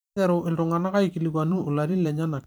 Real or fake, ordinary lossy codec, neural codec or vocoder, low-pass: fake; none; vocoder, 44.1 kHz, 128 mel bands every 512 samples, BigVGAN v2; none